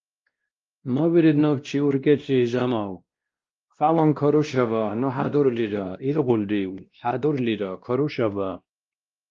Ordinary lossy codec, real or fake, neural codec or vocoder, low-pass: Opus, 32 kbps; fake; codec, 16 kHz, 1 kbps, X-Codec, WavLM features, trained on Multilingual LibriSpeech; 7.2 kHz